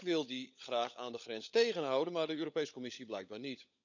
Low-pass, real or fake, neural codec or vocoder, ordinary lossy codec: 7.2 kHz; fake; codec, 16 kHz, 16 kbps, FunCodec, trained on LibriTTS, 50 frames a second; none